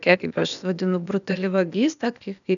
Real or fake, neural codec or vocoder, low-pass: fake; codec, 16 kHz, 0.8 kbps, ZipCodec; 7.2 kHz